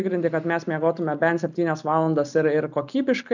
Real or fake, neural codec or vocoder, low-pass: real; none; 7.2 kHz